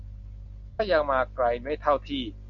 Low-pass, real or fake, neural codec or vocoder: 7.2 kHz; real; none